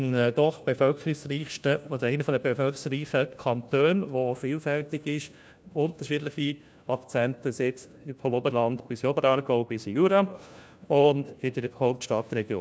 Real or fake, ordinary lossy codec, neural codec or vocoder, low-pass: fake; none; codec, 16 kHz, 1 kbps, FunCodec, trained on LibriTTS, 50 frames a second; none